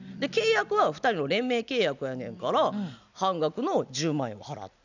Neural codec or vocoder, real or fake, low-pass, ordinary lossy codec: none; real; 7.2 kHz; none